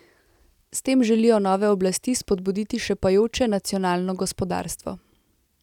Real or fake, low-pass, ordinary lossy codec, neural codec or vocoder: real; 19.8 kHz; none; none